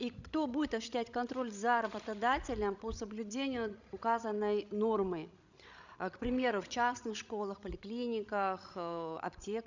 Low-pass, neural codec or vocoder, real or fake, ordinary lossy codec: 7.2 kHz; codec, 16 kHz, 16 kbps, FreqCodec, larger model; fake; none